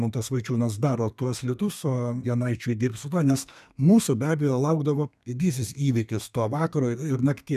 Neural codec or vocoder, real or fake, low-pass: codec, 32 kHz, 1.9 kbps, SNAC; fake; 14.4 kHz